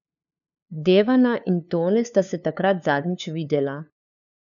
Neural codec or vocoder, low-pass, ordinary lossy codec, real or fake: codec, 16 kHz, 2 kbps, FunCodec, trained on LibriTTS, 25 frames a second; 7.2 kHz; none; fake